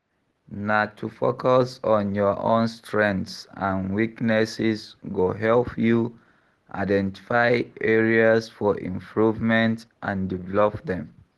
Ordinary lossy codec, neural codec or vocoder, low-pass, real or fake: Opus, 16 kbps; none; 10.8 kHz; real